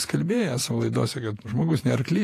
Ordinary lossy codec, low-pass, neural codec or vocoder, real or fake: AAC, 48 kbps; 14.4 kHz; vocoder, 48 kHz, 128 mel bands, Vocos; fake